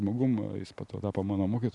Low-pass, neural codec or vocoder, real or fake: 10.8 kHz; none; real